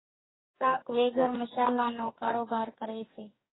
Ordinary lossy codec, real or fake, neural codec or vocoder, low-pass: AAC, 16 kbps; fake; codec, 44.1 kHz, 2.6 kbps, DAC; 7.2 kHz